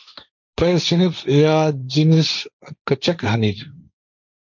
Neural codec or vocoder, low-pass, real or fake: codec, 16 kHz, 1.1 kbps, Voila-Tokenizer; 7.2 kHz; fake